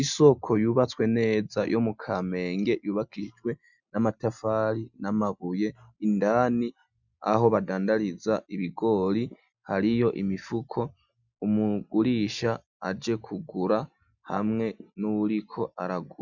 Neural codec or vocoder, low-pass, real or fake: none; 7.2 kHz; real